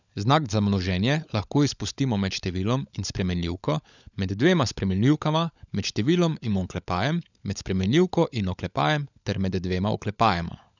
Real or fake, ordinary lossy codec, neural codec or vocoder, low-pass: fake; none; codec, 16 kHz, 16 kbps, FunCodec, trained on LibriTTS, 50 frames a second; 7.2 kHz